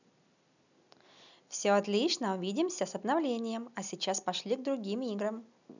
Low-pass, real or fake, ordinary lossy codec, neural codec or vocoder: 7.2 kHz; real; none; none